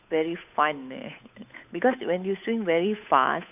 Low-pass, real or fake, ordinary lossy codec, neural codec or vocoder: 3.6 kHz; fake; none; codec, 16 kHz, 8 kbps, FunCodec, trained on Chinese and English, 25 frames a second